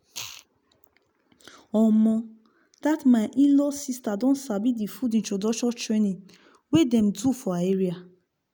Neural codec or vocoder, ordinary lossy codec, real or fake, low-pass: none; none; real; none